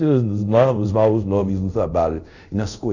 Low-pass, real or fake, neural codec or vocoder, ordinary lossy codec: 7.2 kHz; fake; codec, 24 kHz, 0.5 kbps, DualCodec; none